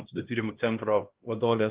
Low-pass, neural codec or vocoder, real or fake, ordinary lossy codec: 3.6 kHz; codec, 16 kHz, 1 kbps, X-Codec, HuBERT features, trained on LibriSpeech; fake; Opus, 16 kbps